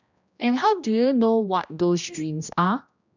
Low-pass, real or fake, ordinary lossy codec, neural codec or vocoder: 7.2 kHz; fake; none; codec, 16 kHz, 1 kbps, X-Codec, HuBERT features, trained on general audio